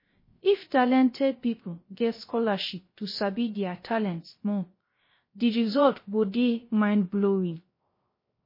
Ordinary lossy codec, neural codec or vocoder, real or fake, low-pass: MP3, 24 kbps; codec, 16 kHz, 0.3 kbps, FocalCodec; fake; 5.4 kHz